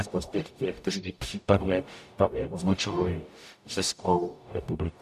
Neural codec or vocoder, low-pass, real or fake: codec, 44.1 kHz, 0.9 kbps, DAC; 14.4 kHz; fake